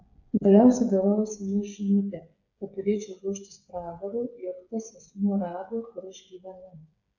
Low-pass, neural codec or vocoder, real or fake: 7.2 kHz; codec, 16 kHz, 8 kbps, FreqCodec, smaller model; fake